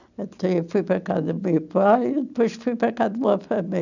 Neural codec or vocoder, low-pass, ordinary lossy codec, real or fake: none; 7.2 kHz; none; real